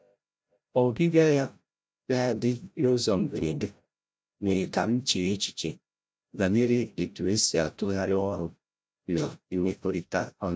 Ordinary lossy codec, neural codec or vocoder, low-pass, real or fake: none; codec, 16 kHz, 0.5 kbps, FreqCodec, larger model; none; fake